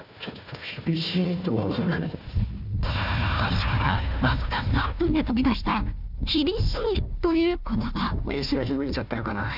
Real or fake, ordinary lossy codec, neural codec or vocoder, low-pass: fake; none; codec, 16 kHz, 1 kbps, FunCodec, trained on Chinese and English, 50 frames a second; 5.4 kHz